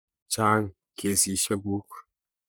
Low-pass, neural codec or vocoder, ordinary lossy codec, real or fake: none; codec, 44.1 kHz, 3.4 kbps, Pupu-Codec; none; fake